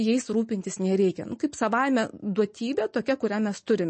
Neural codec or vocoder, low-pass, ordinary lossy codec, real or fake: none; 9.9 kHz; MP3, 32 kbps; real